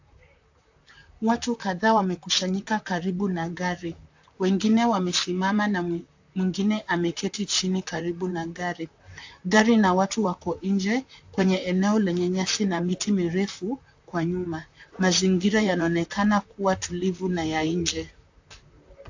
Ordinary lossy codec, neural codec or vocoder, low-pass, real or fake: AAC, 48 kbps; vocoder, 44.1 kHz, 128 mel bands, Pupu-Vocoder; 7.2 kHz; fake